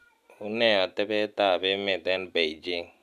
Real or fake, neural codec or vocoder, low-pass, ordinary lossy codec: real; none; 14.4 kHz; none